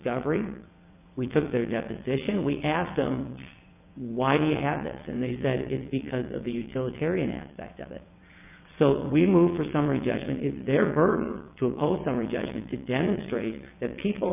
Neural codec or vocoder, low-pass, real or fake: vocoder, 22.05 kHz, 80 mel bands, WaveNeXt; 3.6 kHz; fake